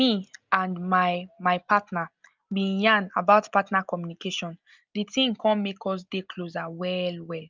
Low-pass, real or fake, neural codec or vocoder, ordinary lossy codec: 7.2 kHz; real; none; Opus, 24 kbps